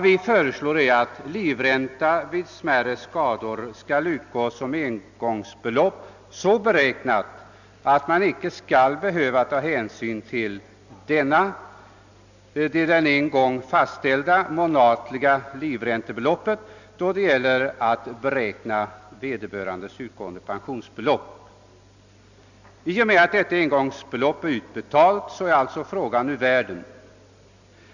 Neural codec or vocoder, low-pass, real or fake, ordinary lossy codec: none; 7.2 kHz; real; none